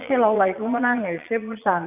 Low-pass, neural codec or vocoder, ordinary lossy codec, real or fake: 3.6 kHz; vocoder, 22.05 kHz, 80 mel bands, Vocos; none; fake